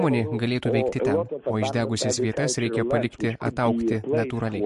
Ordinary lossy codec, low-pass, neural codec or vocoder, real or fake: MP3, 48 kbps; 14.4 kHz; none; real